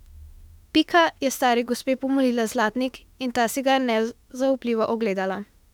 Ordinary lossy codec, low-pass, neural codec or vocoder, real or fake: none; 19.8 kHz; autoencoder, 48 kHz, 32 numbers a frame, DAC-VAE, trained on Japanese speech; fake